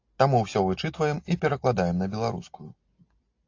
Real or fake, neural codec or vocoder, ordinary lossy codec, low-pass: real; none; AAC, 48 kbps; 7.2 kHz